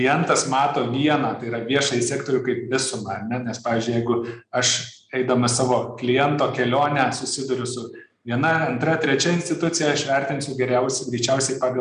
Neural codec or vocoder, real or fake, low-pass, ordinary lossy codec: none; real; 9.9 kHz; MP3, 96 kbps